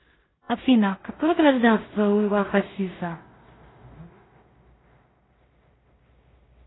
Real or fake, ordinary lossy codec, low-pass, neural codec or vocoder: fake; AAC, 16 kbps; 7.2 kHz; codec, 16 kHz in and 24 kHz out, 0.4 kbps, LongCat-Audio-Codec, two codebook decoder